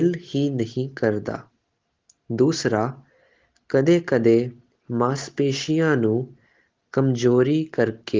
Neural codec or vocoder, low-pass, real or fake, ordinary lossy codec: none; 7.2 kHz; real; Opus, 16 kbps